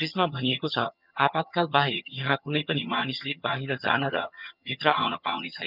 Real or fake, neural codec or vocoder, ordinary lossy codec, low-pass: fake; vocoder, 22.05 kHz, 80 mel bands, HiFi-GAN; none; 5.4 kHz